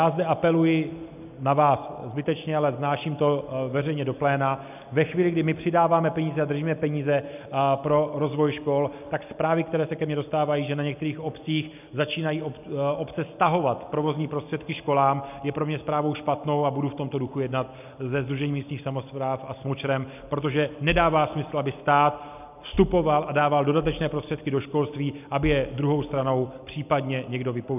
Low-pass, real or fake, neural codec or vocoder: 3.6 kHz; real; none